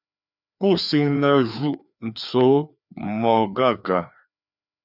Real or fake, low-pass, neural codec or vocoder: fake; 5.4 kHz; codec, 16 kHz, 2 kbps, FreqCodec, larger model